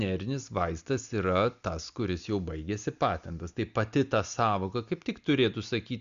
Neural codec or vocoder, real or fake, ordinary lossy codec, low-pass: none; real; Opus, 64 kbps; 7.2 kHz